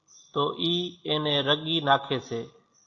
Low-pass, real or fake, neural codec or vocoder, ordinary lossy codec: 7.2 kHz; real; none; AAC, 48 kbps